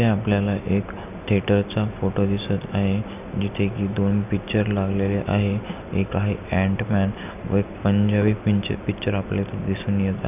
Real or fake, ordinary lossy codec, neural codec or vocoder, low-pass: real; none; none; 3.6 kHz